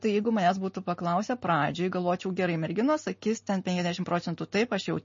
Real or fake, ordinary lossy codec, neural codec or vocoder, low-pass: real; MP3, 32 kbps; none; 7.2 kHz